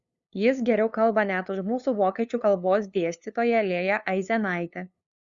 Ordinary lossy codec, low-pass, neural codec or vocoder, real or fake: Opus, 64 kbps; 7.2 kHz; codec, 16 kHz, 2 kbps, FunCodec, trained on LibriTTS, 25 frames a second; fake